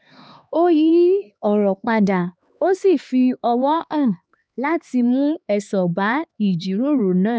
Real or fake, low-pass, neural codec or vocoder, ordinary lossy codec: fake; none; codec, 16 kHz, 2 kbps, X-Codec, HuBERT features, trained on LibriSpeech; none